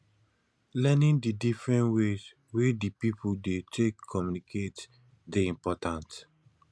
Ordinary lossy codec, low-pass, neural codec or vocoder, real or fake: none; none; none; real